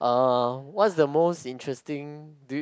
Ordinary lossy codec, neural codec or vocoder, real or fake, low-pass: none; none; real; none